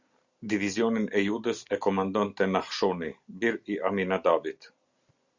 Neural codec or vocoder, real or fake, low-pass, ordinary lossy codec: none; real; 7.2 kHz; Opus, 64 kbps